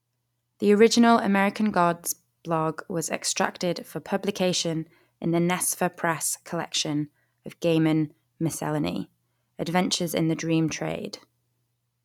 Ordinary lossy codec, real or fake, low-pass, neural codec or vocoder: none; real; 19.8 kHz; none